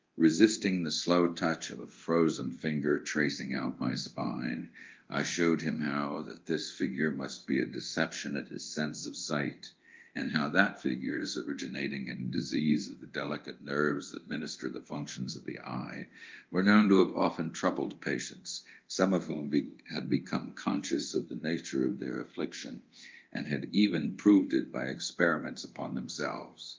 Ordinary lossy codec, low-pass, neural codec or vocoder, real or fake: Opus, 32 kbps; 7.2 kHz; codec, 24 kHz, 0.9 kbps, DualCodec; fake